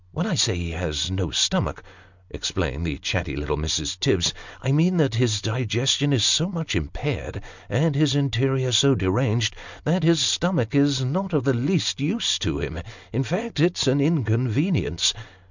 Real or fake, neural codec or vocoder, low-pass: real; none; 7.2 kHz